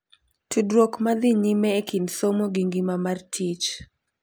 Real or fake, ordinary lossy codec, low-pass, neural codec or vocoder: real; none; none; none